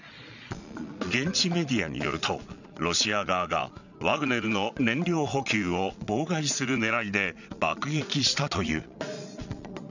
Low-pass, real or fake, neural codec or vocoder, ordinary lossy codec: 7.2 kHz; fake; vocoder, 22.05 kHz, 80 mel bands, Vocos; none